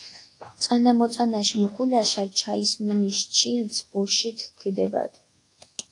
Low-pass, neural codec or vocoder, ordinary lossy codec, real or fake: 10.8 kHz; codec, 24 kHz, 1.2 kbps, DualCodec; AAC, 48 kbps; fake